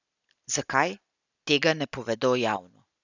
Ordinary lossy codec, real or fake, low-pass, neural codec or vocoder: none; real; 7.2 kHz; none